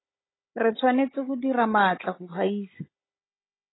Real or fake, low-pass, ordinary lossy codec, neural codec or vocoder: fake; 7.2 kHz; AAC, 16 kbps; codec, 16 kHz, 16 kbps, FunCodec, trained on Chinese and English, 50 frames a second